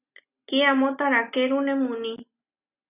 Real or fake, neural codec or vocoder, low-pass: real; none; 3.6 kHz